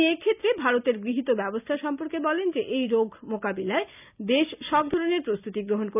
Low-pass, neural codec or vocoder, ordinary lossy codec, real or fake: 3.6 kHz; none; none; real